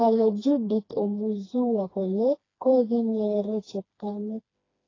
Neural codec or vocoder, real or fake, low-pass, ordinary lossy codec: codec, 16 kHz, 2 kbps, FreqCodec, smaller model; fake; 7.2 kHz; AAC, 32 kbps